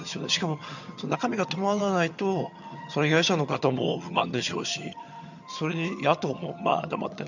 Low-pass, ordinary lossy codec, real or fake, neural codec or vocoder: 7.2 kHz; none; fake; vocoder, 22.05 kHz, 80 mel bands, HiFi-GAN